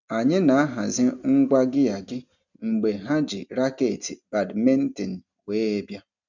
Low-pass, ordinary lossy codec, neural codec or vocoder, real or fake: 7.2 kHz; none; none; real